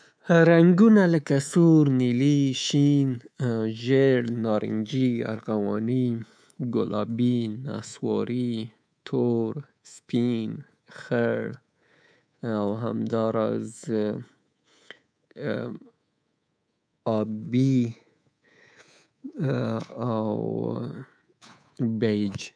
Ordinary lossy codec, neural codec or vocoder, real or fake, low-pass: none; codec, 24 kHz, 3.1 kbps, DualCodec; fake; 9.9 kHz